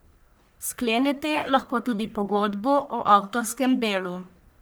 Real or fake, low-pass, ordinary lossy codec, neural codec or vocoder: fake; none; none; codec, 44.1 kHz, 1.7 kbps, Pupu-Codec